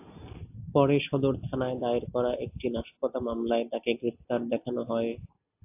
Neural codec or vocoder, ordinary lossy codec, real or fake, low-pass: none; AAC, 32 kbps; real; 3.6 kHz